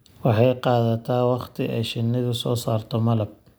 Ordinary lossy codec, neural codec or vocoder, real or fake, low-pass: none; none; real; none